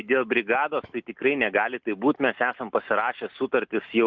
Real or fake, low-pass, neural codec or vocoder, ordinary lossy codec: real; 7.2 kHz; none; Opus, 24 kbps